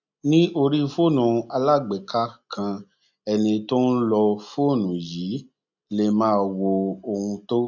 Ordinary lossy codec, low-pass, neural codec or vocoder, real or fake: none; 7.2 kHz; none; real